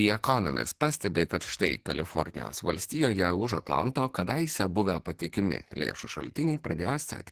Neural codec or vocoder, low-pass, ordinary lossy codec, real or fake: codec, 44.1 kHz, 2.6 kbps, SNAC; 14.4 kHz; Opus, 16 kbps; fake